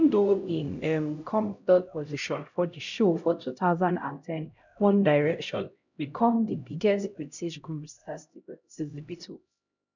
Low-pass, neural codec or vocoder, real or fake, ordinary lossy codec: 7.2 kHz; codec, 16 kHz, 0.5 kbps, X-Codec, HuBERT features, trained on LibriSpeech; fake; none